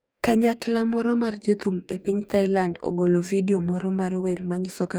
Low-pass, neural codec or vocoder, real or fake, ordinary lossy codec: none; codec, 44.1 kHz, 2.6 kbps, DAC; fake; none